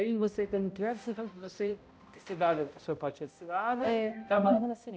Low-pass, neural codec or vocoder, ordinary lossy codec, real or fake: none; codec, 16 kHz, 0.5 kbps, X-Codec, HuBERT features, trained on balanced general audio; none; fake